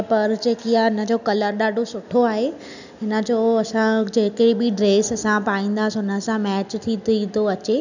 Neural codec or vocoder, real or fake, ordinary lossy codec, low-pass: none; real; none; 7.2 kHz